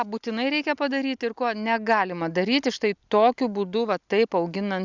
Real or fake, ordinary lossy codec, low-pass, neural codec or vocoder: real; Opus, 64 kbps; 7.2 kHz; none